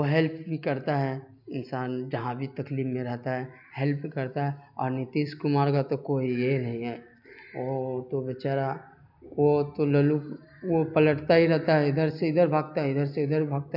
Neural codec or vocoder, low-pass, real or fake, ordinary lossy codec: none; 5.4 kHz; real; none